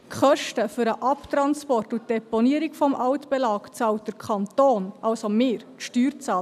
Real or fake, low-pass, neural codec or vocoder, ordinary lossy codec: real; 14.4 kHz; none; none